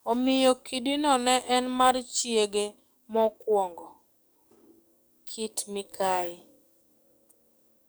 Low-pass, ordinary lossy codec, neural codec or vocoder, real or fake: none; none; codec, 44.1 kHz, 7.8 kbps, DAC; fake